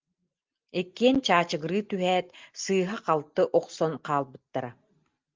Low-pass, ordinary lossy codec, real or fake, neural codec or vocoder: 7.2 kHz; Opus, 32 kbps; real; none